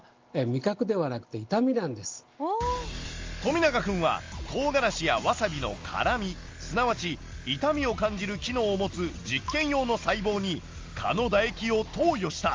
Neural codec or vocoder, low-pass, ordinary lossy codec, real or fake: none; 7.2 kHz; Opus, 32 kbps; real